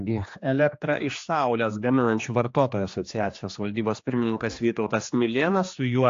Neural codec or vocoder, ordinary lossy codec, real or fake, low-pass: codec, 16 kHz, 2 kbps, X-Codec, HuBERT features, trained on general audio; AAC, 48 kbps; fake; 7.2 kHz